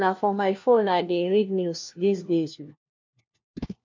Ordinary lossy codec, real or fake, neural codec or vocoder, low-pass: MP3, 64 kbps; fake; codec, 16 kHz, 1 kbps, FunCodec, trained on LibriTTS, 50 frames a second; 7.2 kHz